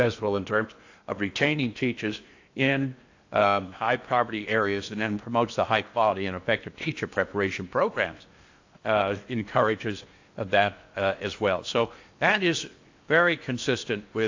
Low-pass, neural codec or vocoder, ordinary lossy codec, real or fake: 7.2 kHz; codec, 16 kHz in and 24 kHz out, 0.8 kbps, FocalCodec, streaming, 65536 codes; AAC, 48 kbps; fake